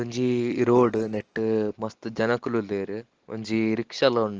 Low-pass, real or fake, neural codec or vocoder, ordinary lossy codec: 7.2 kHz; real; none; Opus, 16 kbps